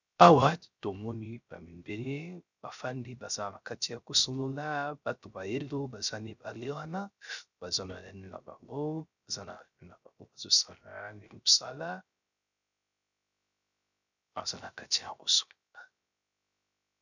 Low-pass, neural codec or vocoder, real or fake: 7.2 kHz; codec, 16 kHz, 0.3 kbps, FocalCodec; fake